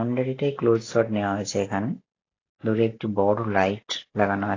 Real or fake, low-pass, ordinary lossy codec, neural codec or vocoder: real; 7.2 kHz; AAC, 32 kbps; none